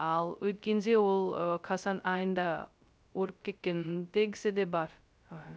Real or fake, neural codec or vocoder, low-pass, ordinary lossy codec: fake; codec, 16 kHz, 0.2 kbps, FocalCodec; none; none